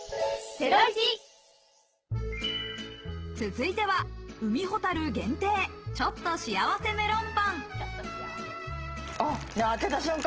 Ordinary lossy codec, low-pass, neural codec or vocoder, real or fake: Opus, 16 kbps; 7.2 kHz; none; real